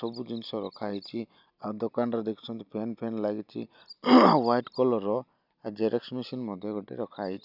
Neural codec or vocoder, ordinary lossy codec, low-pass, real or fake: none; none; 5.4 kHz; real